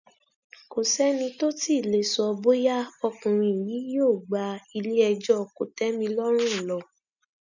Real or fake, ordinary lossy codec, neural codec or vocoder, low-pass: real; none; none; 7.2 kHz